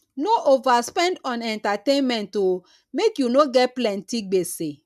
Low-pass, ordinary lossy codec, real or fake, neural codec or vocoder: 14.4 kHz; none; real; none